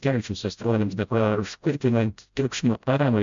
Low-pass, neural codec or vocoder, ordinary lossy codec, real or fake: 7.2 kHz; codec, 16 kHz, 0.5 kbps, FreqCodec, smaller model; MP3, 64 kbps; fake